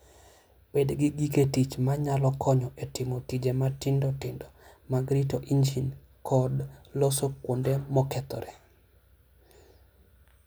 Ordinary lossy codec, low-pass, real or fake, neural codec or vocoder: none; none; real; none